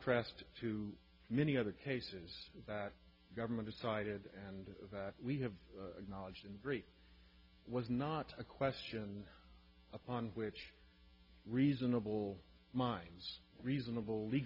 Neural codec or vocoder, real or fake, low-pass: none; real; 5.4 kHz